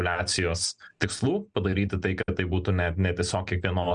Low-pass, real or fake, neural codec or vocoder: 9.9 kHz; real; none